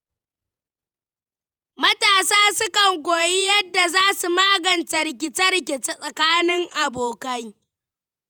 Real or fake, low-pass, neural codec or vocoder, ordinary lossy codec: fake; none; vocoder, 48 kHz, 128 mel bands, Vocos; none